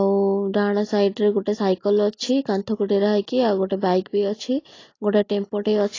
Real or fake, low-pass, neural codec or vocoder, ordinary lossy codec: real; 7.2 kHz; none; AAC, 32 kbps